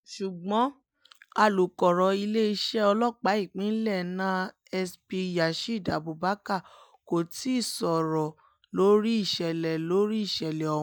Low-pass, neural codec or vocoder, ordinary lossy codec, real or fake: none; none; none; real